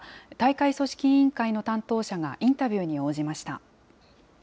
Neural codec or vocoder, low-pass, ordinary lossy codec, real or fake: none; none; none; real